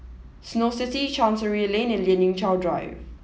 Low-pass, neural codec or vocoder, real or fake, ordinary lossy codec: none; none; real; none